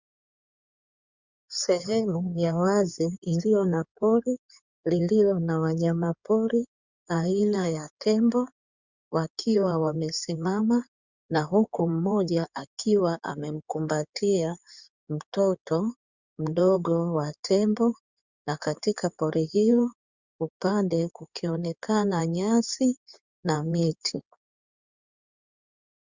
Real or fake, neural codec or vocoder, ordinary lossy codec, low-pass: fake; codec, 16 kHz in and 24 kHz out, 2.2 kbps, FireRedTTS-2 codec; Opus, 64 kbps; 7.2 kHz